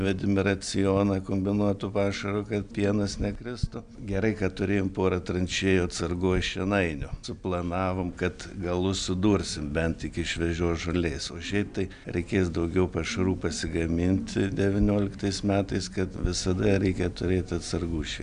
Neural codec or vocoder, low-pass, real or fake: none; 9.9 kHz; real